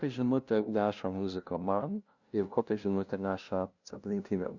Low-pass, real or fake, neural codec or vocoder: 7.2 kHz; fake; codec, 16 kHz, 0.5 kbps, FunCodec, trained on LibriTTS, 25 frames a second